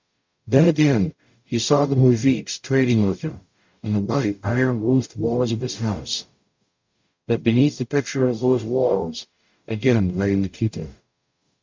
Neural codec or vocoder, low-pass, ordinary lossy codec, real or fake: codec, 44.1 kHz, 0.9 kbps, DAC; 7.2 kHz; MP3, 64 kbps; fake